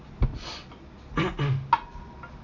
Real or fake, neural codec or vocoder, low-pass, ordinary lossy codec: real; none; 7.2 kHz; none